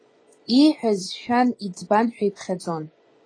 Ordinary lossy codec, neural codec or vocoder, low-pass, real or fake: AAC, 32 kbps; vocoder, 24 kHz, 100 mel bands, Vocos; 9.9 kHz; fake